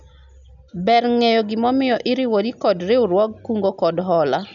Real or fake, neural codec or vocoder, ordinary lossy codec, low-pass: real; none; none; 7.2 kHz